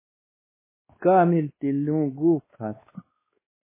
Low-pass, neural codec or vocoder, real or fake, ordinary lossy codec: 3.6 kHz; codec, 16 kHz, 2 kbps, X-Codec, WavLM features, trained on Multilingual LibriSpeech; fake; MP3, 16 kbps